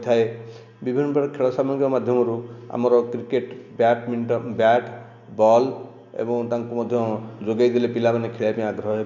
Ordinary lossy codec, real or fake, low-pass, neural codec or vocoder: none; real; 7.2 kHz; none